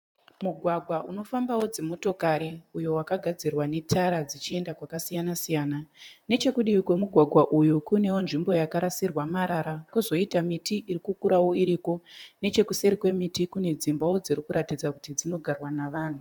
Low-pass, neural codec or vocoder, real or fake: 19.8 kHz; vocoder, 44.1 kHz, 128 mel bands, Pupu-Vocoder; fake